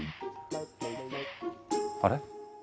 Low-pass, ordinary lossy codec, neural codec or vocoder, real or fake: none; none; none; real